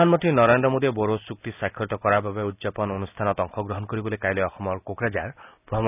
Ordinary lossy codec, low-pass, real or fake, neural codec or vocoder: none; 3.6 kHz; real; none